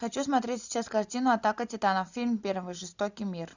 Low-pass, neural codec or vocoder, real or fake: 7.2 kHz; none; real